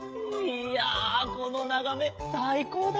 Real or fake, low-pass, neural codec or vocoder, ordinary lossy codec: fake; none; codec, 16 kHz, 16 kbps, FreqCodec, smaller model; none